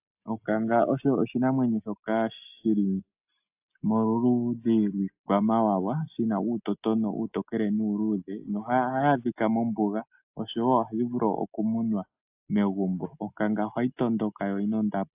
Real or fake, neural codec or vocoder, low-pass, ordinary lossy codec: real; none; 3.6 kHz; AAC, 32 kbps